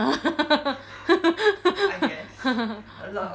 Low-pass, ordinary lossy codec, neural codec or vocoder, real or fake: none; none; none; real